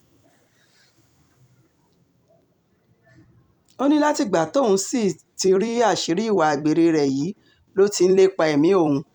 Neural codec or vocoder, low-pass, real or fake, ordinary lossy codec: vocoder, 44.1 kHz, 128 mel bands every 256 samples, BigVGAN v2; 19.8 kHz; fake; none